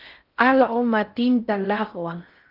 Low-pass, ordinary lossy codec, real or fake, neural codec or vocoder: 5.4 kHz; Opus, 24 kbps; fake; codec, 16 kHz in and 24 kHz out, 0.6 kbps, FocalCodec, streaming, 4096 codes